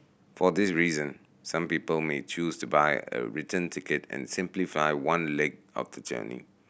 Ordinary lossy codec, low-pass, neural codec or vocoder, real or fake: none; none; none; real